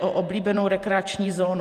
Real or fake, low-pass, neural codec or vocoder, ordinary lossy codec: fake; 14.4 kHz; vocoder, 48 kHz, 128 mel bands, Vocos; Opus, 32 kbps